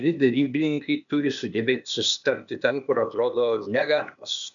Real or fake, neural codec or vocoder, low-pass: fake; codec, 16 kHz, 0.8 kbps, ZipCodec; 7.2 kHz